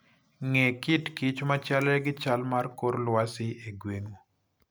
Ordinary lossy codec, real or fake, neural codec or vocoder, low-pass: none; real; none; none